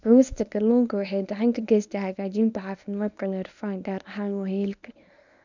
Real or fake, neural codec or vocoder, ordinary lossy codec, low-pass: fake; codec, 24 kHz, 0.9 kbps, WavTokenizer, medium speech release version 1; none; 7.2 kHz